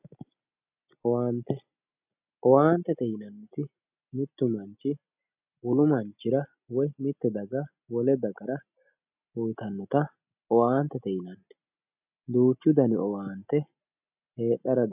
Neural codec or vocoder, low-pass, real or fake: none; 3.6 kHz; real